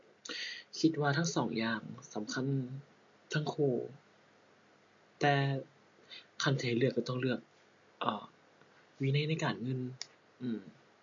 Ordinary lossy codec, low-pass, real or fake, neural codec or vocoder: MP3, 48 kbps; 7.2 kHz; real; none